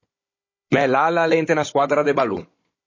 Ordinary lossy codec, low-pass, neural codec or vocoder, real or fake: MP3, 32 kbps; 7.2 kHz; codec, 16 kHz, 16 kbps, FunCodec, trained on Chinese and English, 50 frames a second; fake